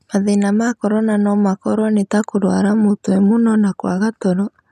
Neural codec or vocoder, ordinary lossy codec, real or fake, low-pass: none; none; real; 14.4 kHz